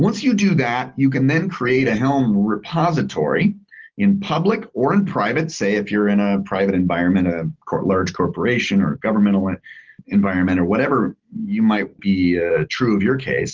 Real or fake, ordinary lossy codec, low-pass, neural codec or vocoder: real; Opus, 16 kbps; 7.2 kHz; none